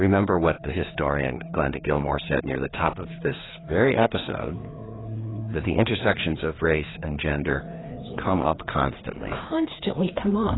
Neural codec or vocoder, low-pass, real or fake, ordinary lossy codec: codec, 16 kHz, 2 kbps, FreqCodec, larger model; 7.2 kHz; fake; AAC, 16 kbps